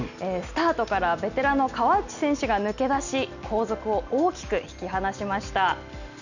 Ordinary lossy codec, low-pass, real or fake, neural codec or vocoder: none; 7.2 kHz; real; none